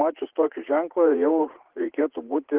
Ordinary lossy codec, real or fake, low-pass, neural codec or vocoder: Opus, 16 kbps; fake; 3.6 kHz; vocoder, 44.1 kHz, 80 mel bands, Vocos